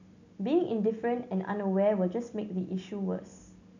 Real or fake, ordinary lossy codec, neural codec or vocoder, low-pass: real; none; none; 7.2 kHz